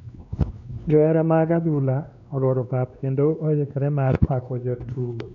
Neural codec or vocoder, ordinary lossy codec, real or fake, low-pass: codec, 16 kHz, 2 kbps, X-Codec, WavLM features, trained on Multilingual LibriSpeech; none; fake; 7.2 kHz